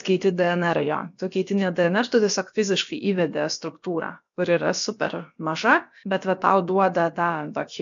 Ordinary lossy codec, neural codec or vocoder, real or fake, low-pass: MP3, 64 kbps; codec, 16 kHz, about 1 kbps, DyCAST, with the encoder's durations; fake; 7.2 kHz